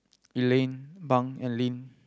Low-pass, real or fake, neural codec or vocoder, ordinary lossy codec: none; real; none; none